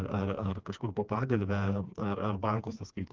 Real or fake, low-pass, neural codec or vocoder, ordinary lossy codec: fake; 7.2 kHz; codec, 16 kHz, 2 kbps, FreqCodec, smaller model; Opus, 32 kbps